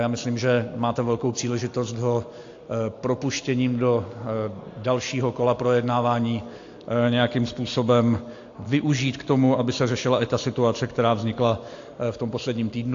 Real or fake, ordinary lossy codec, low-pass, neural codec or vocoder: real; AAC, 48 kbps; 7.2 kHz; none